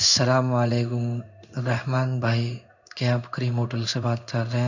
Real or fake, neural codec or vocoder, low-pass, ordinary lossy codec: fake; codec, 16 kHz in and 24 kHz out, 1 kbps, XY-Tokenizer; 7.2 kHz; none